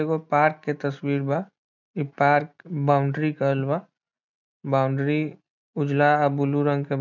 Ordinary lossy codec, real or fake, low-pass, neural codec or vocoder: none; real; 7.2 kHz; none